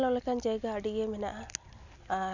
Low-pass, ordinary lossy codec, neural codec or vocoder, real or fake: 7.2 kHz; none; none; real